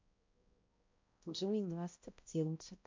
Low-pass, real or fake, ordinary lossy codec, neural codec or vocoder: 7.2 kHz; fake; MP3, 48 kbps; codec, 16 kHz, 0.5 kbps, X-Codec, HuBERT features, trained on balanced general audio